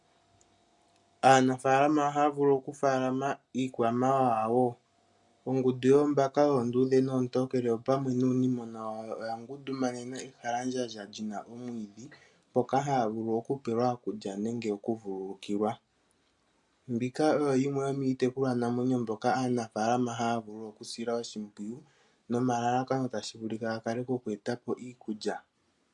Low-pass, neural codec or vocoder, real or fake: 10.8 kHz; none; real